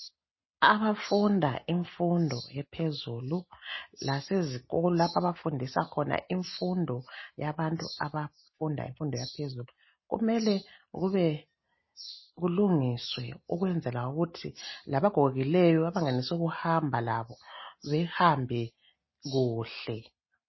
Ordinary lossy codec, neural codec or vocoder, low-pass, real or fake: MP3, 24 kbps; none; 7.2 kHz; real